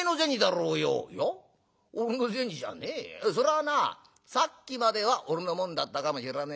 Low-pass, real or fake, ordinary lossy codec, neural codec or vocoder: none; real; none; none